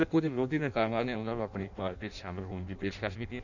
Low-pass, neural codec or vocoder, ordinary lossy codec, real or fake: 7.2 kHz; codec, 16 kHz in and 24 kHz out, 0.6 kbps, FireRedTTS-2 codec; none; fake